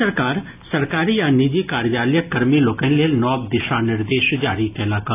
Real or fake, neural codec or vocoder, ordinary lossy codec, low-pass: real; none; AAC, 24 kbps; 3.6 kHz